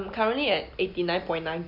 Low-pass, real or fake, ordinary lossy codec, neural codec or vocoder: 5.4 kHz; real; none; none